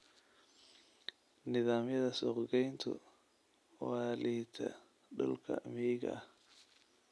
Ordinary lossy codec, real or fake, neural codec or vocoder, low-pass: none; real; none; none